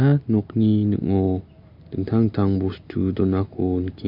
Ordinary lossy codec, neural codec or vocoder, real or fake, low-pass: MP3, 48 kbps; none; real; 5.4 kHz